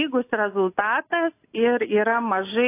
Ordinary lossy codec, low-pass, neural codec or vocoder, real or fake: AAC, 24 kbps; 3.6 kHz; none; real